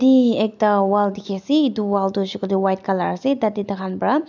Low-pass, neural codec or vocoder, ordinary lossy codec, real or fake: 7.2 kHz; none; none; real